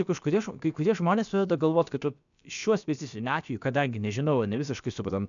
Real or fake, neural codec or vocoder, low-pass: fake; codec, 16 kHz, about 1 kbps, DyCAST, with the encoder's durations; 7.2 kHz